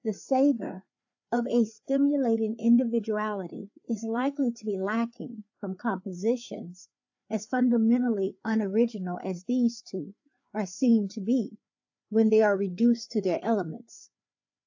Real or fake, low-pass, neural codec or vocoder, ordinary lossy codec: fake; 7.2 kHz; codec, 16 kHz, 4 kbps, FreqCodec, larger model; AAC, 48 kbps